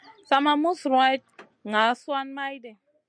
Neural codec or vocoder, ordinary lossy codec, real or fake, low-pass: none; MP3, 96 kbps; real; 9.9 kHz